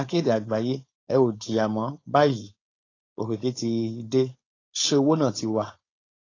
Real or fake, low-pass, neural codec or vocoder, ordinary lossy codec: fake; 7.2 kHz; codec, 16 kHz, 4.8 kbps, FACodec; AAC, 32 kbps